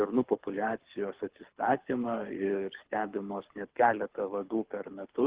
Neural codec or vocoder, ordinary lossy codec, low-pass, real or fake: codec, 24 kHz, 6 kbps, HILCodec; Opus, 16 kbps; 3.6 kHz; fake